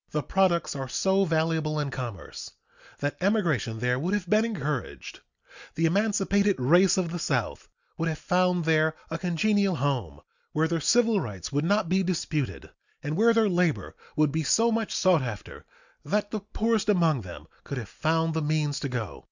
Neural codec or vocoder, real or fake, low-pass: none; real; 7.2 kHz